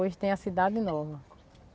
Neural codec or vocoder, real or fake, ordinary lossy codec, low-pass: none; real; none; none